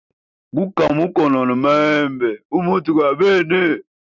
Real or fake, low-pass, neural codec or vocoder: real; 7.2 kHz; none